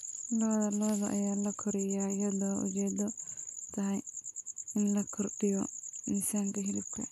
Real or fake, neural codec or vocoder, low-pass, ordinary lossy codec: real; none; 14.4 kHz; none